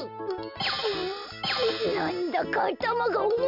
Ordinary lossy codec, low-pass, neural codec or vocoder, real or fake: none; 5.4 kHz; none; real